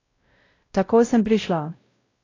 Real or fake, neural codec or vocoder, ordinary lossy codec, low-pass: fake; codec, 16 kHz, 0.5 kbps, X-Codec, WavLM features, trained on Multilingual LibriSpeech; AAC, 32 kbps; 7.2 kHz